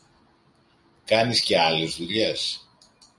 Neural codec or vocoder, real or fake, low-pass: none; real; 10.8 kHz